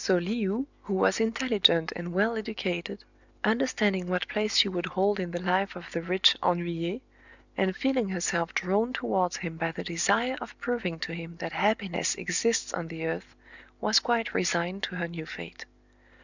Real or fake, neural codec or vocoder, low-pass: real; none; 7.2 kHz